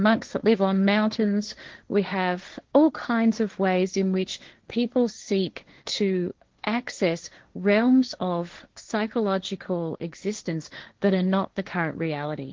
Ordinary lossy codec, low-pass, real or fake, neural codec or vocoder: Opus, 16 kbps; 7.2 kHz; fake; codec, 16 kHz, 1.1 kbps, Voila-Tokenizer